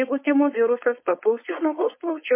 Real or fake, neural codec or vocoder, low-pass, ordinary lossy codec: fake; codec, 16 kHz, 4.8 kbps, FACodec; 3.6 kHz; MP3, 24 kbps